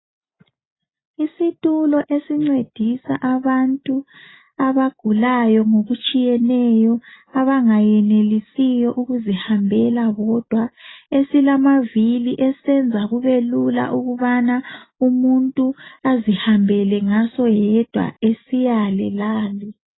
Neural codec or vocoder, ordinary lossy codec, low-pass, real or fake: none; AAC, 16 kbps; 7.2 kHz; real